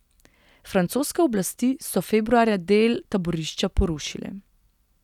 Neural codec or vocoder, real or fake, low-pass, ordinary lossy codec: none; real; 19.8 kHz; none